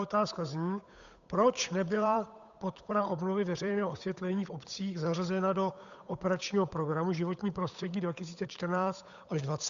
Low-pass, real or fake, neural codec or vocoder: 7.2 kHz; fake; codec, 16 kHz, 8 kbps, FunCodec, trained on Chinese and English, 25 frames a second